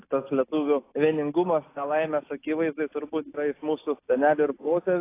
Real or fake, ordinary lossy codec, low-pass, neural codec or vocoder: real; AAC, 24 kbps; 3.6 kHz; none